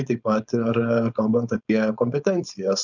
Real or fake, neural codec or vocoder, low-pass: fake; codec, 16 kHz, 4.8 kbps, FACodec; 7.2 kHz